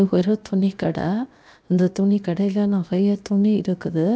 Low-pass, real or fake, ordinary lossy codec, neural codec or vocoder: none; fake; none; codec, 16 kHz, 0.7 kbps, FocalCodec